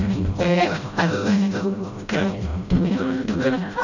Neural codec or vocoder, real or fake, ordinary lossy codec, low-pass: codec, 16 kHz, 0.5 kbps, FreqCodec, smaller model; fake; none; 7.2 kHz